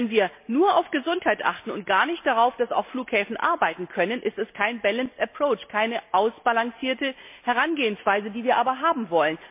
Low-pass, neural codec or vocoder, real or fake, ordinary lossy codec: 3.6 kHz; none; real; MP3, 32 kbps